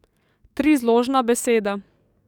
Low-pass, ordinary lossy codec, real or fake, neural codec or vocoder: 19.8 kHz; none; fake; codec, 44.1 kHz, 7.8 kbps, DAC